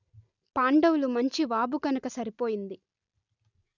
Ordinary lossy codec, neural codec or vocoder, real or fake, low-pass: none; none; real; 7.2 kHz